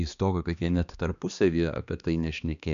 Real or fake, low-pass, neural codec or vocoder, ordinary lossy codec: fake; 7.2 kHz; codec, 16 kHz, 2 kbps, X-Codec, HuBERT features, trained on balanced general audio; MP3, 96 kbps